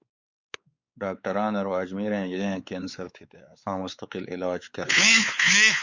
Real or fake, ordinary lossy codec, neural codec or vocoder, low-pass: fake; Opus, 64 kbps; codec, 16 kHz, 4 kbps, X-Codec, WavLM features, trained on Multilingual LibriSpeech; 7.2 kHz